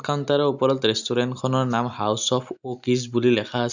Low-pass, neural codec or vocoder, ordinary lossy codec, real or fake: 7.2 kHz; none; none; real